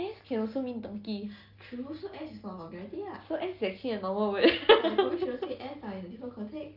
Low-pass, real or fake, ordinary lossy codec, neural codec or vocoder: 5.4 kHz; real; Opus, 24 kbps; none